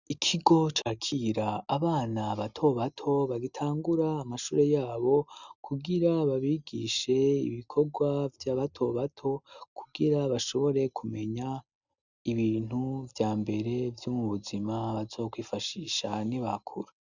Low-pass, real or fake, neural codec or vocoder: 7.2 kHz; real; none